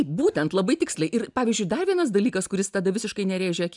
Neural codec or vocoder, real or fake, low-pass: none; real; 10.8 kHz